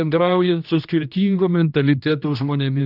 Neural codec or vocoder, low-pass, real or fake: codec, 16 kHz, 1 kbps, X-Codec, HuBERT features, trained on general audio; 5.4 kHz; fake